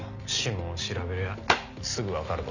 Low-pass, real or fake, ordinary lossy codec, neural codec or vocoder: 7.2 kHz; real; none; none